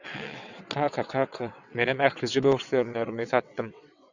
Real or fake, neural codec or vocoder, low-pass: fake; vocoder, 22.05 kHz, 80 mel bands, WaveNeXt; 7.2 kHz